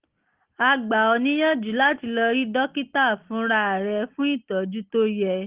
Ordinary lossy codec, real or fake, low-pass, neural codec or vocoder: Opus, 16 kbps; real; 3.6 kHz; none